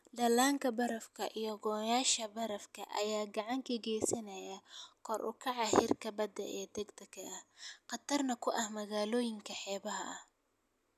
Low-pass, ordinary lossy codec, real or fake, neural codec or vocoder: 14.4 kHz; none; real; none